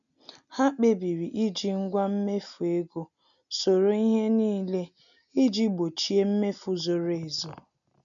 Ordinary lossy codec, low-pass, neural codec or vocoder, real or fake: none; 7.2 kHz; none; real